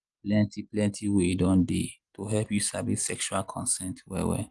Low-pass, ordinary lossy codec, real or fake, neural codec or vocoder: 10.8 kHz; Opus, 24 kbps; real; none